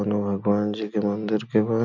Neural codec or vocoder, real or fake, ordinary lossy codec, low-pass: none; real; none; 7.2 kHz